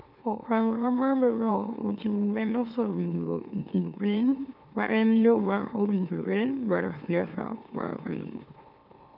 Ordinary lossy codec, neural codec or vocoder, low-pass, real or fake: none; autoencoder, 44.1 kHz, a latent of 192 numbers a frame, MeloTTS; 5.4 kHz; fake